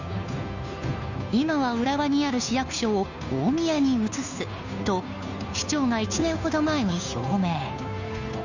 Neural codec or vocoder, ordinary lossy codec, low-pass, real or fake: codec, 16 kHz, 2 kbps, FunCodec, trained on Chinese and English, 25 frames a second; none; 7.2 kHz; fake